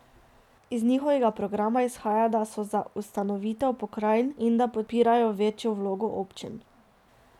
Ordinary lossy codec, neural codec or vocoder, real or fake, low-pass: none; none; real; 19.8 kHz